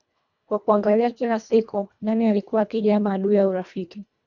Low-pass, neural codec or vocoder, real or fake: 7.2 kHz; codec, 24 kHz, 1.5 kbps, HILCodec; fake